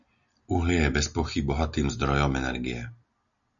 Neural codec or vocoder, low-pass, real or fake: none; 7.2 kHz; real